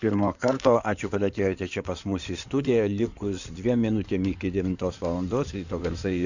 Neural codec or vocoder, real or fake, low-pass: codec, 16 kHz in and 24 kHz out, 2.2 kbps, FireRedTTS-2 codec; fake; 7.2 kHz